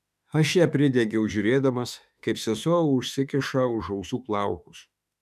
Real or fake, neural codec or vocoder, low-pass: fake; autoencoder, 48 kHz, 32 numbers a frame, DAC-VAE, trained on Japanese speech; 14.4 kHz